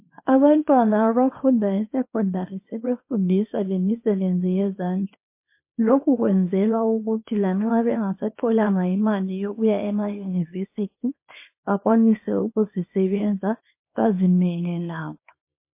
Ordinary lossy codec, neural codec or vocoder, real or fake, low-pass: MP3, 24 kbps; codec, 24 kHz, 0.9 kbps, WavTokenizer, small release; fake; 3.6 kHz